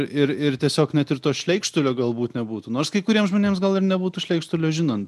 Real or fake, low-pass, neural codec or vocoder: real; 14.4 kHz; none